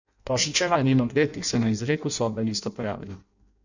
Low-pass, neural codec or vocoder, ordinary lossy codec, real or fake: 7.2 kHz; codec, 16 kHz in and 24 kHz out, 0.6 kbps, FireRedTTS-2 codec; none; fake